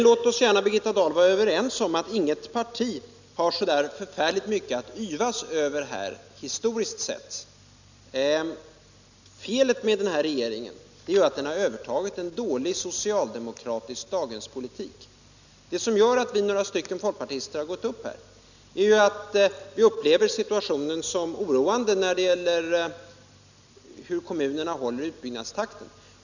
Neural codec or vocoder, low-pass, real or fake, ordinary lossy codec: none; 7.2 kHz; real; none